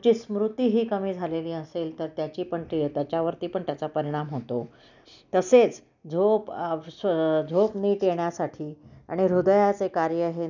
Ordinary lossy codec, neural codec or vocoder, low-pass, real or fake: none; none; 7.2 kHz; real